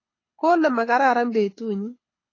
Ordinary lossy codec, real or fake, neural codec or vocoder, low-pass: AAC, 32 kbps; fake; codec, 24 kHz, 6 kbps, HILCodec; 7.2 kHz